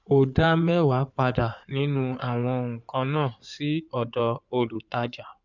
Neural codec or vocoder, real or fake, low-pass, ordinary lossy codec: codec, 16 kHz in and 24 kHz out, 2.2 kbps, FireRedTTS-2 codec; fake; 7.2 kHz; none